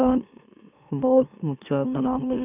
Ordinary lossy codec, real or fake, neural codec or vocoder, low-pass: none; fake; autoencoder, 44.1 kHz, a latent of 192 numbers a frame, MeloTTS; 3.6 kHz